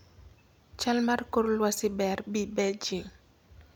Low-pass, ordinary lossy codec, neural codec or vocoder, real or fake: none; none; none; real